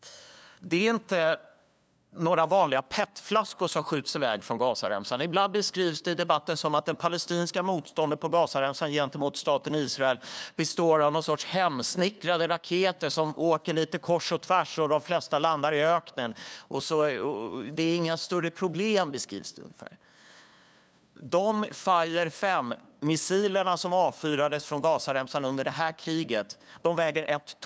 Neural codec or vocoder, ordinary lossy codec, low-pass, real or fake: codec, 16 kHz, 2 kbps, FunCodec, trained on LibriTTS, 25 frames a second; none; none; fake